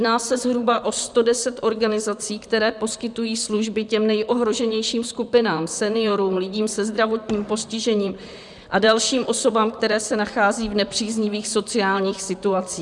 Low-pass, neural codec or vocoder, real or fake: 10.8 kHz; vocoder, 44.1 kHz, 128 mel bands, Pupu-Vocoder; fake